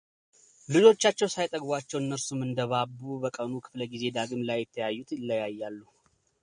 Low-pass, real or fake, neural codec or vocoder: 9.9 kHz; real; none